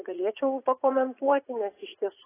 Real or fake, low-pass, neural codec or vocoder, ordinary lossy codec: fake; 3.6 kHz; vocoder, 44.1 kHz, 80 mel bands, Vocos; AAC, 16 kbps